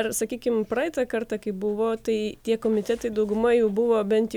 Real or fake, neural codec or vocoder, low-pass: real; none; 19.8 kHz